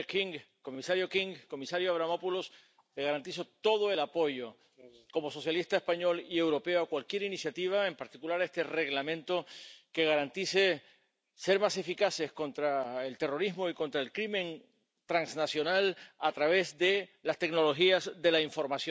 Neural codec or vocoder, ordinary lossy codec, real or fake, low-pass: none; none; real; none